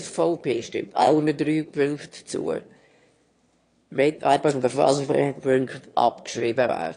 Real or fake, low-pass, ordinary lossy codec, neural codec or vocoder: fake; 9.9 kHz; AAC, 48 kbps; autoencoder, 22.05 kHz, a latent of 192 numbers a frame, VITS, trained on one speaker